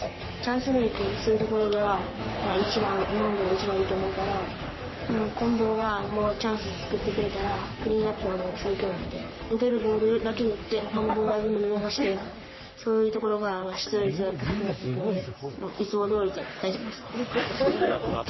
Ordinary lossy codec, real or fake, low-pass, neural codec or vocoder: MP3, 24 kbps; fake; 7.2 kHz; codec, 44.1 kHz, 3.4 kbps, Pupu-Codec